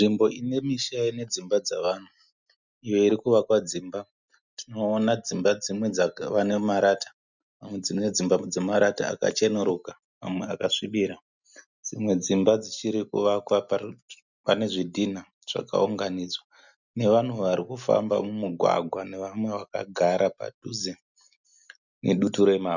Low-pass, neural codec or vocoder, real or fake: 7.2 kHz; none; real